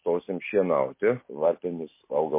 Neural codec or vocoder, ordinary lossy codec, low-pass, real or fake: none; MP3, 24 kbps; 3.6 kHz; real